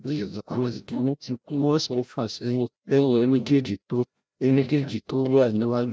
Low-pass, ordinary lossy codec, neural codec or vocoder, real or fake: none; none; codec, 16 kHz, 0.5 kbps, FreqCodec, larger model; fake